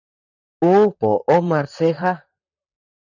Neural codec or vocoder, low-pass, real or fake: vocoder, 44.1 kHz, 128 mel bands, Pupu-Vocoder; 7.2 kHz; fake